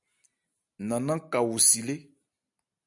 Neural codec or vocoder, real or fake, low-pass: none; real; 10.8 kHz